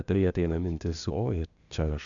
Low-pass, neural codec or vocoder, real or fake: 7.2 kHz; codec, 16 kHz, 0.8 kbps, ZipCodec; fake